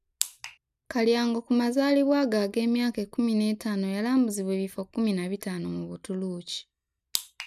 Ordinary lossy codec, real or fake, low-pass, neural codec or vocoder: none; real; 14.4 kHz; none